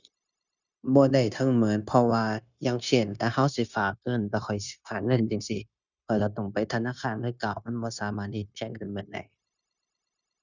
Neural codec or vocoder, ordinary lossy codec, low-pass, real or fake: codec, 16 kHz, 0.9 kbps, LongCat-Audio-Codec; none; 7.2 kHz; fake